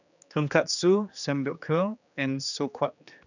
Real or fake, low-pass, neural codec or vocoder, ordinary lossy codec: fake; 7.2 kHz; codec, 16 kHz, 2 kbps, X-Codec, HuBERT features, trained on general audio; none